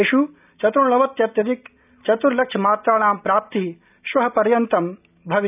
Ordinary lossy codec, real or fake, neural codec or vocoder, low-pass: none; real; none; 3.6 kHz